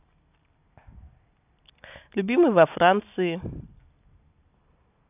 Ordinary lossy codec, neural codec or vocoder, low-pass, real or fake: none; none; 3.6 kHz; real